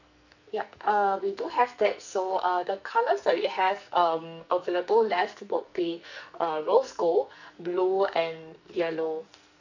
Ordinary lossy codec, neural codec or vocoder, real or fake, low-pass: none; codec, 44.1 kHz, 2.6 kbps, SNAC; fake; 7.2 kHz